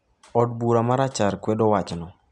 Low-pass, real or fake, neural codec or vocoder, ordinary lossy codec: 10.8 kHz; real; none; none